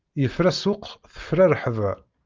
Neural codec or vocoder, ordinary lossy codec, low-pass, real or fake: none; Opus, 24 kbps; 7.2 kHz; real